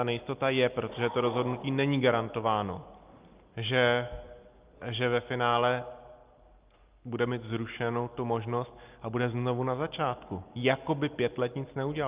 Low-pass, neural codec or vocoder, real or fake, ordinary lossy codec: 3.6 kHz; none; real; Opus, 24 kbps